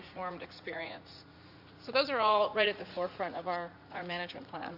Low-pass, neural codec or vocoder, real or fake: 5.4 kHz; vocoder, 44.1 kHz, 80 mel bands, Vocos; fake